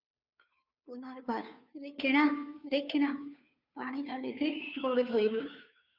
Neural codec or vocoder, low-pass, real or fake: codec, 16 kHz, 2 kbps, FunCodec, trained on Chinese and English, 25 frames a second; 5.4 kHz; fake